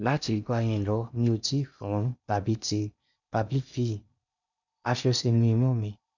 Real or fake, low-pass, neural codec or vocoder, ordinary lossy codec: fake; 7.2 kHz; codec, 16 kHz in and 24 kHz out, 0.6 kbps, FocalCodec, streaming, 4096 codes; none